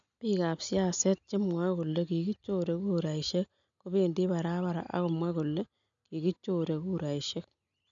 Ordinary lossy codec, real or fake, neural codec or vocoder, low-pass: none; real; none; 7.2 kHz